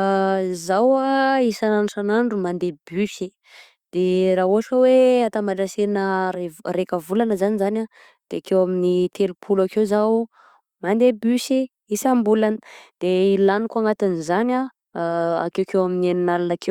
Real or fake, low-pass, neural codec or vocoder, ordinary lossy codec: real; 19.8 kHz; none; Opus, 64 kbps